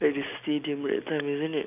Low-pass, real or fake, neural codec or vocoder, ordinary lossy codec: 3.6 kHz; real; none; none